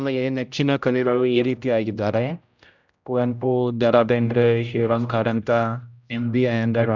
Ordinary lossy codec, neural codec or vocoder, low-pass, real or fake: none; codec, 16 kHz, 0.5 kbps, X-Codec, HuBERT features, trained on general audio; 7.2 kHz; fake